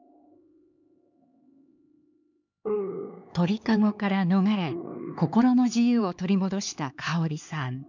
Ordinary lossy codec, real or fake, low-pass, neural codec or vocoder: none; fake; 7.2 kHz; codec, 16 kHz, 4 kbps, X-Codec, HuBERT features, trained on LibriSpeech